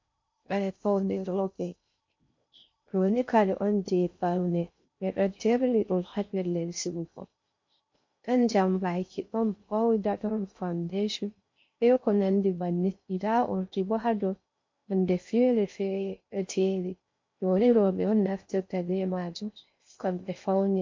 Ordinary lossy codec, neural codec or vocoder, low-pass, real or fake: MP3, 48 kbps; codec, 16 kHz in and 24 kHz out, 0.6 kbps, FocalCodec, streaming, 2048 codes; 7.2 kHz; fake